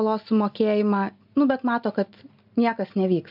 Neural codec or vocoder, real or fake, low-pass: none; real; 5.4 kHz